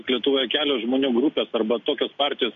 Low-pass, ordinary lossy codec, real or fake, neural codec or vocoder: 7.2 kHz; MP3, 48 kbps; real; none